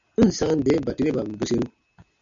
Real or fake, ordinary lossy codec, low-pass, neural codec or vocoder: real; MP3, 96 kbps; 7.2 kHz; none